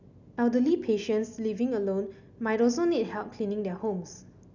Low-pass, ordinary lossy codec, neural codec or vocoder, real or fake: 7.2 kHz; none; none; real